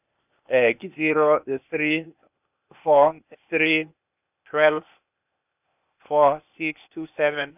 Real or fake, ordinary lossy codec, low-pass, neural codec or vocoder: fake; none; 3.6 kHz; codec, 16 kHz, 0.8 kbps, ZipCodec